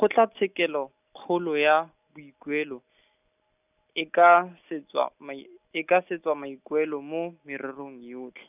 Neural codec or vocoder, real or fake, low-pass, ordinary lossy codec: none; real; 3.6 kHz; none